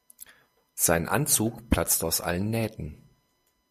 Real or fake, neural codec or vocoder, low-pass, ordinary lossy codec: real; none; 14.4 kHz; MP3, 64 kbps